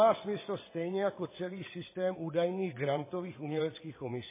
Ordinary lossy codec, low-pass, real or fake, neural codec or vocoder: MP3, 16 kbps; 3.6 kHz; fake; vocoder, 22.05 kHz, 80 mel bands, WaveNeXt